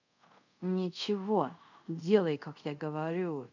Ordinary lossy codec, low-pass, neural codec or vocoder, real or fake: none; 7.2 kHz; codec, 24 kHz, 0.5 kbps, DualCodec; fake